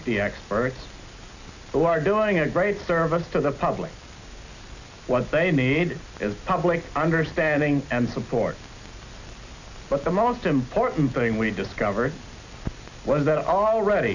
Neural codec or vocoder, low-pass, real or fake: none; 7.2 kHz; real